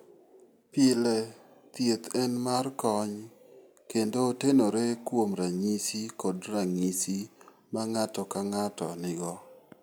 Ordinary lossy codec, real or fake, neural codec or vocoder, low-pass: none; real; none; none